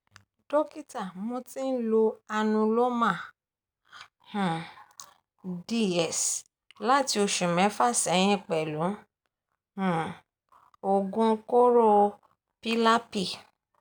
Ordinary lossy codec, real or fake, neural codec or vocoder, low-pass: none; real; none; none